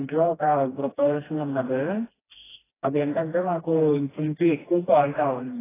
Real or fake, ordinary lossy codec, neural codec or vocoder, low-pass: fake; AAC, 16 kbps; codec, 16 kHz, 1 kbps, FreqCodec, smaller model; 3.6 kHz